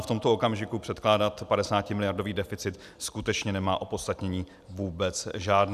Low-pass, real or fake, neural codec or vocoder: 14.4 kHz; real; none